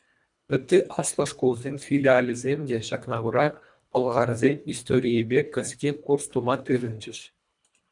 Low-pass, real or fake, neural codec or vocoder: 10.8 kHz; fake; codec, 24 kHz, 1.5 kbps, HILCodec